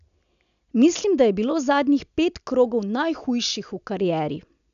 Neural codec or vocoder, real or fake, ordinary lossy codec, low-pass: none; real; none; 7.2 kHz